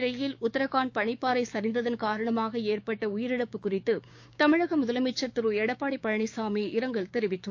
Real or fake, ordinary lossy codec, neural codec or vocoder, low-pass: fake; AAC, 48 kbps; codec, 16 kHz, 6 kbps, DAC; 7.2 kHz